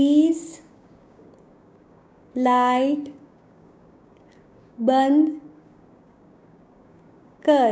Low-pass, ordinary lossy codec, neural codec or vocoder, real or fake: none; none; codec, 16 kHz, 6 kbps, DAC; fake